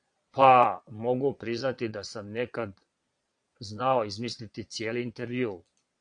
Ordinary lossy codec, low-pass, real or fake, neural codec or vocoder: AAC, 64 kbps; 9.9 kHz; fake; vocoder, 22.05 kHz, 80 mel bands, Vocos